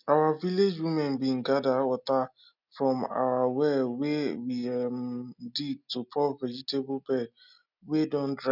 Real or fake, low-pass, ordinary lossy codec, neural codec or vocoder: real; 5.4 kHz; none; none